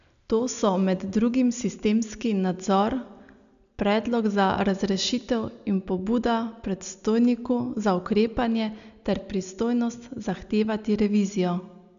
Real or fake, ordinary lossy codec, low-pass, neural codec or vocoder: real; none; 7.2 kHz; none